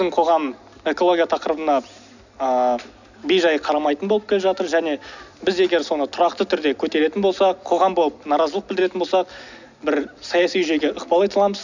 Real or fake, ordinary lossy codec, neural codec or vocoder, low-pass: real; none; none; 7.2 kHz